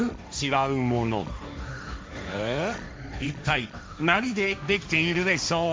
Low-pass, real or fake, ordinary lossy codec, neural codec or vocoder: none; fake; none; codec, 16 kHz, 1.1 kbps, Voila-Tokenizer